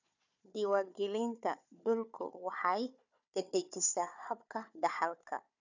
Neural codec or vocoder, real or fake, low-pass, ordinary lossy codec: codec, 16 kHz, 4 kbps, FunCodec, trained on Chinese and English, 50 frames a second; fake; 7.2 kHz; none